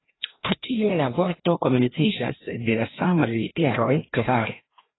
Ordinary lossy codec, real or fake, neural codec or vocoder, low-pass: AAC, 16 kbps; fake; codec, 16 kHz, 1 kbps, FreqCodec, larger model; 7.2 kHz